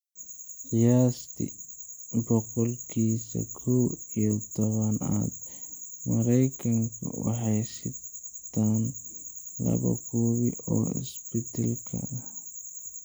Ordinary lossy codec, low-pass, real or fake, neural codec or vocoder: none; none; real; none